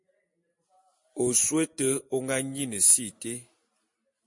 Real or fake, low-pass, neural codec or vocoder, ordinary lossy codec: real; 10.8 kHz; none; MP3, 48 kbps